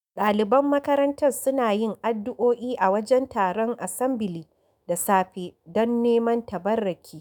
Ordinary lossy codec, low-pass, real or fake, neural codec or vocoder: none; none; fake; autoencoder, 48 kHz, 128 numbers a frame, DAC-VAE, trained on Japanese speech